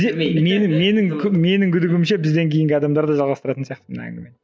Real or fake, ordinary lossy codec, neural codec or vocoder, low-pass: real; none; none; none